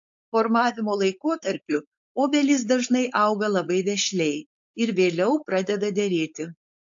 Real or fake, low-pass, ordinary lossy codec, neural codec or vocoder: fake; 7.2 kHz; AAC, 64 kbps; codec, 16 kHz, 4.8 kbps, FACodec